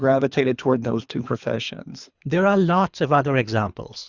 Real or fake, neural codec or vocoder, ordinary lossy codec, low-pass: fake; codec, 24 kHz, 3 kbps, HILCodec; Opus, 64 kbps; 7.2 kHz